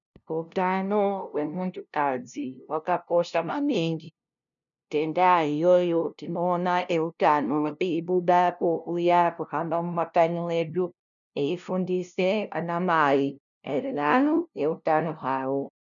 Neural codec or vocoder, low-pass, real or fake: codec, 16 kHz, 0.5 kbps, FunCodec, trained on LibriTTS, 25 frames a second; 7.2 kHz; fake